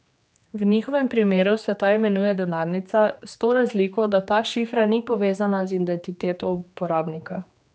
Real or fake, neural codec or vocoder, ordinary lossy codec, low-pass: fake; codec, 16 kHz, 2 kbps, X-Codec, HuBERT features, trained on general audio; none; none